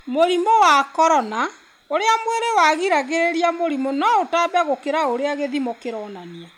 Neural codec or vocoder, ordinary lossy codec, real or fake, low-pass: none; MP3, 96 kbps; real; 19.8 kHz